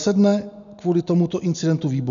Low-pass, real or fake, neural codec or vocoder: 7.2 kHz; real; none